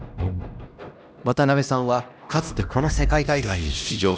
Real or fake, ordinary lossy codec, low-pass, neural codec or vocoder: fake; none; none; codec, 16 kHz, 1 kbps, X-Codec, HuBERT features, trained on LibriSpeech